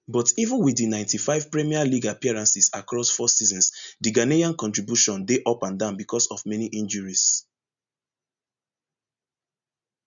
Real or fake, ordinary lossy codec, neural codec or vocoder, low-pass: real; none; none; 7.2 kHz